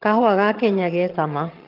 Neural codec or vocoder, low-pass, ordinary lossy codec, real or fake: vocoder, 22.05 kHz, 80 mel bands, HiFi-GAN; 5.4 kHz; Opus, 24 kbps; fake